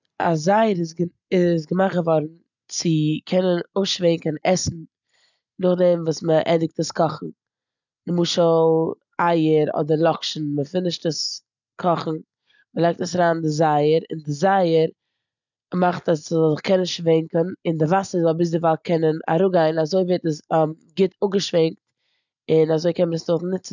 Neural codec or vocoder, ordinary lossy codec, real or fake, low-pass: none; none; real; 7.2 kHz